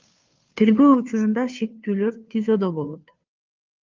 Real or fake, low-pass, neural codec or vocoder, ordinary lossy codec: fake; 7.2 kHz; codec, 16 kHz, 2 kbps, FunCodec, trained on Chinese and English, 25 frames a second; Opus, 24 kbps